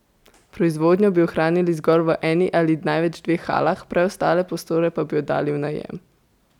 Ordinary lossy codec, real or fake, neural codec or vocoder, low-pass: none; real; none; 19.8 kHz